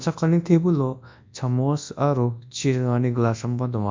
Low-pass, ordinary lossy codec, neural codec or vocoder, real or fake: 7.2 kHz; MP3, 64 kbps; codec, 24 kHz, 0.9 kbps, WavTokenizer, large speech release; fake